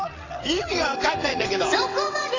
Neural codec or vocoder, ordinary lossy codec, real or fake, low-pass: vocoder, 22.05 kHz, 80 mel bands, WaveNeXt; AAC, 32 kbps; fake; 7.2 kHz